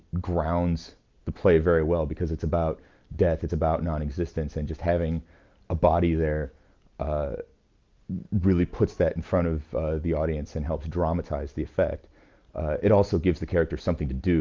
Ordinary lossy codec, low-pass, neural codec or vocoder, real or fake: Opus, 24 kbps; 7.2 kHz; none; real